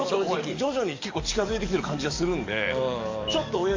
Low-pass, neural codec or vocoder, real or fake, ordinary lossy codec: 7.2 kHz; codec, 44.1 kHz, 7.8 kbps, DAC; fake; MP3, 48 kbps